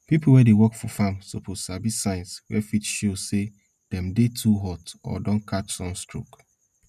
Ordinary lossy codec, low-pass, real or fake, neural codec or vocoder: none; 14.4 kHz; real; none